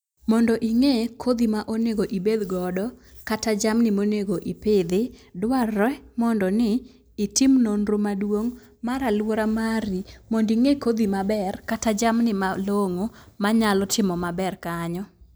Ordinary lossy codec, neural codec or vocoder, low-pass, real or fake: none; none; none; real